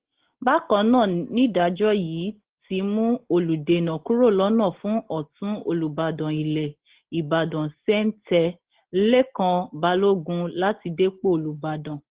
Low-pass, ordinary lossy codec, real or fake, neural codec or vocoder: 3.6 kHz; Opus, 16 kbps; real; none